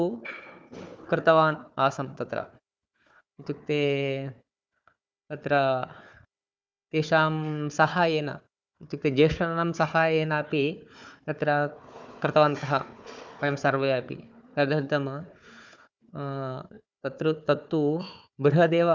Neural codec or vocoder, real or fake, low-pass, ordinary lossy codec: codec, 16 kHz, 4 kbps, FunCodec, trained on Chinese and English, 50 frames a second; fake; none; none